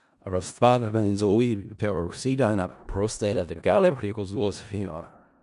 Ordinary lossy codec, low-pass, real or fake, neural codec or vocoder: none; 10.8 kHz; fake; codec, 16 kHz in and 24 kHz out, 0.4 kbps, LongCat-Audio-Codec, four codebook decoder